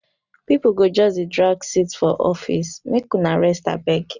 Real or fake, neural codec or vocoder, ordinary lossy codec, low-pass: real; none; none; 7.2 kHz